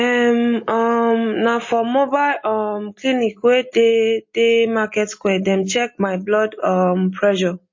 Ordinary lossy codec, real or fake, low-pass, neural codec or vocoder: MP3, 32 kbps; real; 7.2 kHz; none